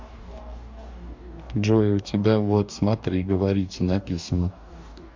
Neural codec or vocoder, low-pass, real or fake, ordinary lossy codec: codec, 44.1 kHz, 2.6 kbps, DAC; 7.2 kHz; fake; MP3, 64 kbps